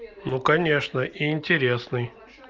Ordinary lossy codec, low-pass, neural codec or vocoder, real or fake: Opus, 24 kbps; 7.2 kHz; none; real